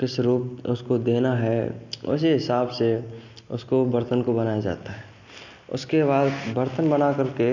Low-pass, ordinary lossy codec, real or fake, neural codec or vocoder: 7.2 kHz; none; real; none